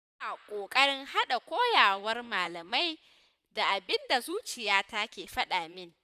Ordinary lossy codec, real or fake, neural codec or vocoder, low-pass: none; fake; vocoder, 44.1 kHz, 128 mel bands, Pupu-Vocoder; 14.4 kHz